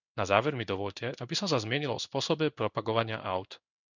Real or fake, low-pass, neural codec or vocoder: fake; 7.2 kHz; codec, 16 kHz in and 24 kHz out, 1 kbps, XY-Tokenizer